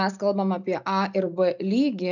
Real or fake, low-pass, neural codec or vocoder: real; 7.2 kHz; none